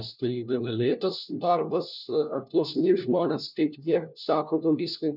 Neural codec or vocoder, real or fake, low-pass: codec, 16 kHz, 1 kbps, FunCodec, trained on LibriTTS, 50 frames a second; fake; 5.4 kHz